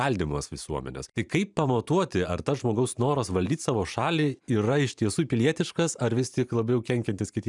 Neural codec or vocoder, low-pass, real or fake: vocoder, 44.1 kHz, 128 mel bands every 512 samples, BigVGAN v2; 10.8 kHz; fake